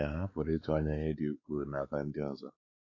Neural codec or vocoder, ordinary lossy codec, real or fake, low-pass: codec, 16 kHz, 4 kbps, X-Codec, WavLM features, trained on Multilingual LibriSpeech; AAC, 32 kbps; fake; 7.2 kHz